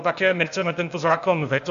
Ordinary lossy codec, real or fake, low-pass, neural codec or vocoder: AAC, 96 kbps; fake; 7.2 kHz; codec, 16 kHz, 0.8 kbps, ZipCodec